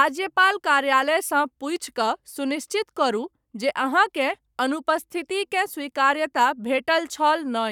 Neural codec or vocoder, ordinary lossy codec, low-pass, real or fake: codec, 44.1 kHz, 7.8 kbps, Pupu-Codec; none; 19.8 kHz; fake